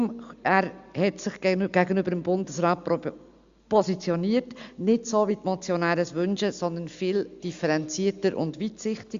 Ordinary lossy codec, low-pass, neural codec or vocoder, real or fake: Opus, 64 kbps; 7.2 kHz; none; real